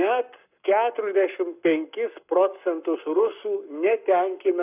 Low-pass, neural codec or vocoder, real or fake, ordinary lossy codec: 3.6 kHz; codec, 44.1 kHz, 7.8 kbps, Pupu-Codec; fake; AAC, 32 kbps